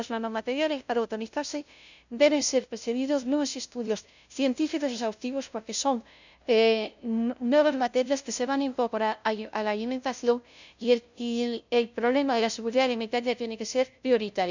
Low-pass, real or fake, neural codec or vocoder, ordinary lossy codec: 7.2 kHz; fake; codec, 16 kHz, 0.5 kbps, FunCodec, trained on LibriTTS, 25 frames a second; none